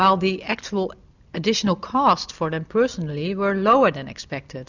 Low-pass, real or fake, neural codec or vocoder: 7.2 kHz; real; none